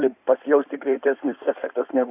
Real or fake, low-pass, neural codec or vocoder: fake; 3.6 kHz; codec, 16 kHz in and 24 kHz out, 2.2 kbps, FireRedTTS-2 codec